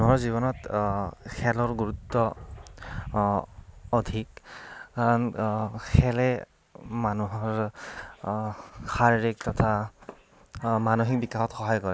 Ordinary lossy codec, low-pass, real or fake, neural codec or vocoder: none; none; real; none